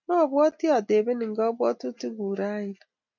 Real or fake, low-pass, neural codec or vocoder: real; 7.2 kHz; none